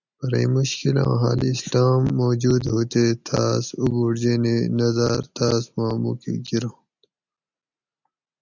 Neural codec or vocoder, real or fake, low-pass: none; real; 7.2 kHz